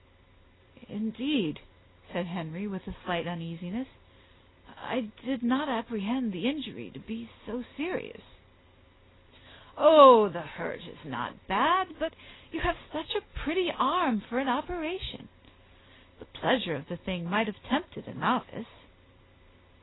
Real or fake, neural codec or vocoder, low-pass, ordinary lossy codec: real; none; 7.2 kHz; AAC, 16 kbps